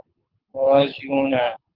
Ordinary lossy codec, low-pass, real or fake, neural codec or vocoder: Opus, 32 kbps; 5.4 kHz; fake; codec, 24 kHz, 6 kbps, HILCodec